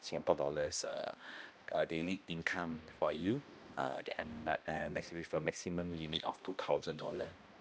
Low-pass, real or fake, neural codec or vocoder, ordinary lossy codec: none; fake; codec, 16 kHz, 1 kbps, X-Codec, HuBERT features, trained on balanced general audio; none